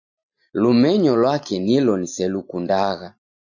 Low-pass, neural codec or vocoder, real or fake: 7.2 kHz; none; real